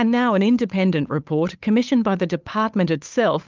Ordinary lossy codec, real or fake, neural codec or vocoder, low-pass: Opus, 24 kbps; fake; codec, 16 kHz, 8 kbps, FunCodec, trained on Chinese and English, 25 frames a second; 7.2 kHz